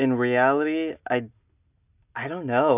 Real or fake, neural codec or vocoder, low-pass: real; none; 3.6 kHz